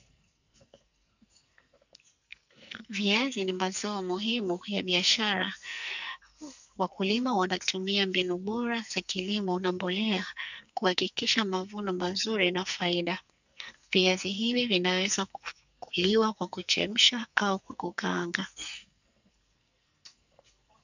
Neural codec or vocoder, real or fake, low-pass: codec, 44.1 kHz, 2.6 kbps, SNAC; fake; 7.2 kHz